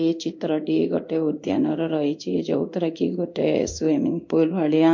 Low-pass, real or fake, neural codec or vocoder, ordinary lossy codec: 7.2 kHz; fake; codec, 16 kHz in and 24 kHz out, 1 kbps, XY-Tokenizer; MP3, 48 kbps